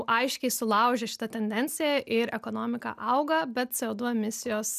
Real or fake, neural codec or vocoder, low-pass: real; none; 14.4 kHz